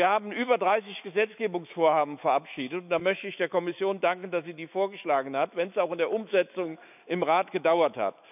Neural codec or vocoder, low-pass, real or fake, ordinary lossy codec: autoencoder, 48 kHz, 128 numbers a frame, DAC-VAE, trained on Japanese speech; 3.6 kHz; fake; none